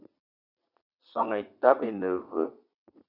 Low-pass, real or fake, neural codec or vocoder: 5.4 kHz; fake; vocoder, 22.05 kHz, 80 mel bands, Vocos